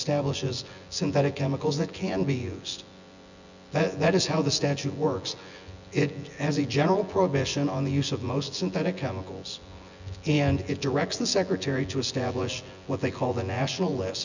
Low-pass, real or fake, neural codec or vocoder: 7.2 kHz; fake; vocoder, 24 kHz, 100 mel bands, Vocos